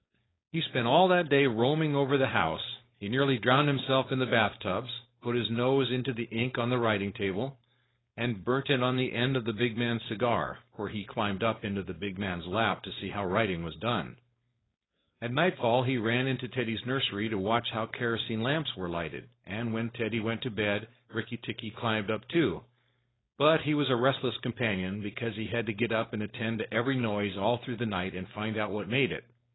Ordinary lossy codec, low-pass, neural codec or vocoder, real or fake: AAC, 16 kbps; 7.2 kHz; codec, 16 kHz, 4.8 kbps, FACodec; fake